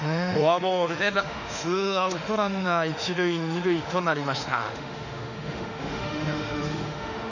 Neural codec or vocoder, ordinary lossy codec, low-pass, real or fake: autoencoder, 48 kHz, 32 numbers a frame, DAC-VAE, trained on Japanese speech; none; 7.2 kHz; fake